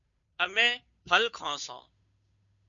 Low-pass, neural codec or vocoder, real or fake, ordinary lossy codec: 7.2 kHz; codec, 16 kHz, 2 kbps, FunCodec, trained on Chinese and English, 25 frames a second; fake; MP3, 64 kbps